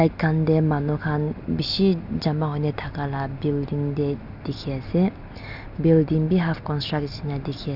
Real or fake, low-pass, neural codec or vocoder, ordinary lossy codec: real; 5.4 kHz; none; none